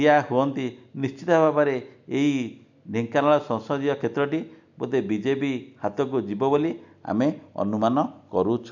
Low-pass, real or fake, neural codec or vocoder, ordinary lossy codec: 7.2 kHz; real; none; none